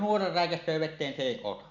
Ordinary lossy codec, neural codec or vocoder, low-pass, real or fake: none; none; 7.2 kHz; real